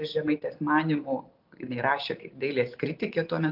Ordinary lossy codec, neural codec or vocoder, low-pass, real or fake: AAC, 48 kbps; none; 5.4 kHz; real